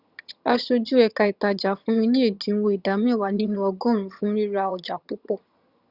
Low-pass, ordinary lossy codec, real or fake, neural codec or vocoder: 5.4 kHz; Opus, 64 kbps; fake; vocoder, 22.05 kHz, 80 mel bands, HiFi-GAN